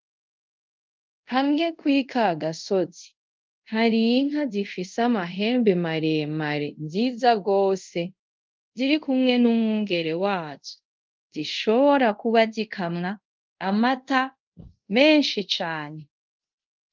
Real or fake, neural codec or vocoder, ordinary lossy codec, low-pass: fake; codec, 24 kHz, 0.5 kbps, DualCodec; Opus, 24 kbps; 7.2 kHz